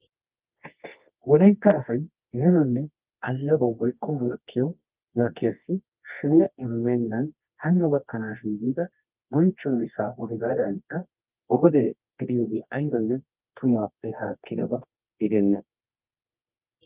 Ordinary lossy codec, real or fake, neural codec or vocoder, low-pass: Opus, 32 kbps; fake; codec, 24 kHz, 0.9 kbps, WavTokenizer, medium music audio release; 3.6 kHz